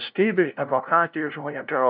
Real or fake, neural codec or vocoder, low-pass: fake; codec, 16 kHz, 0.5 kbps, FunCodec, trained on LibriTTS, 25 frames a second; 5.4 kHz